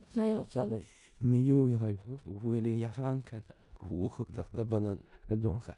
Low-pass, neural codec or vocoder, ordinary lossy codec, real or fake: 10.8 kHz; codec, 16 kHz in and 24 kHz out, 0.4 kbps, LongCat-Audio-Codec, four codebook decoder; none; fake